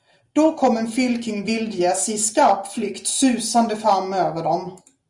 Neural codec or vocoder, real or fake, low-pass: none; real; 10.8 kHz